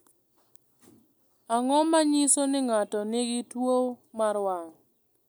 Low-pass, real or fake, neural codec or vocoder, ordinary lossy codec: none; real; none; none